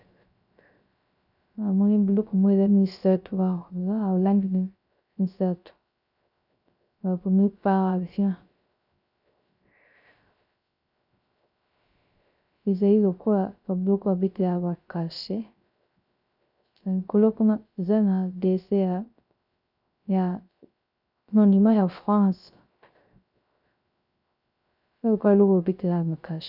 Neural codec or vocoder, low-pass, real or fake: codec, 16 kHz, 0.3 kbps, FocalCodec; 5.4 kHz; fake